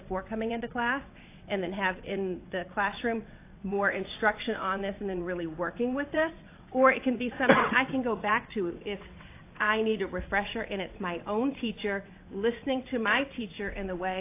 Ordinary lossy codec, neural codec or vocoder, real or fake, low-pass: AAC, 24 kbps; vocoder, 22.05 kHz, 80 mel bands, WaveNeXt; fake; 3.6 kHz